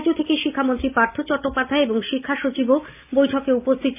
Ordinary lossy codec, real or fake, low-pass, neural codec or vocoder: AAC, 32 kbps; real; 3.6 kHz; none